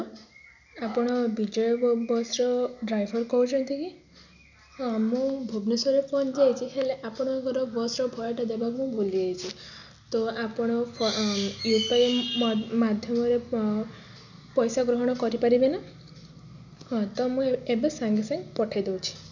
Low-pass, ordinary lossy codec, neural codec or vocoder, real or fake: 7.2 kHz; none; none; real